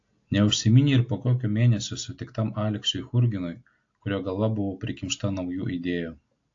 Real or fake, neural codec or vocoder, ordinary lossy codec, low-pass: real; none; AAC, 48 kbps; 7.2 kHz